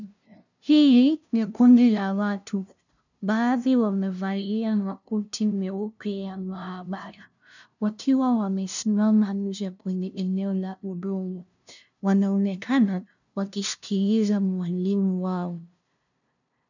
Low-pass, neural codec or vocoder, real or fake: 7.2 kHz; codec, 16 kHz, 0.5 kbps, FunCodec, trained on LibriTTS, 25 frames a second; fake